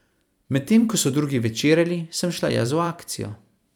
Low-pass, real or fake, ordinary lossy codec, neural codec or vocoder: 19.8 kHz; fake; none; vocoder, 44.1 kHz, 128 mel bands every 256 samples, BigVGAN v2